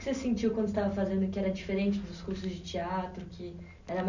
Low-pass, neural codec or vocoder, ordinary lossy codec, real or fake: 7.2 kHz; none; none; real